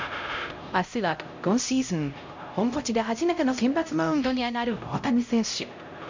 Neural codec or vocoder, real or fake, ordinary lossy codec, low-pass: codec, 16 kHz, 0.5 kbps, X-Codec, HuBERT features, trained on LibriSpeech; fake; MP3, 48 kbps; 7.2 kHz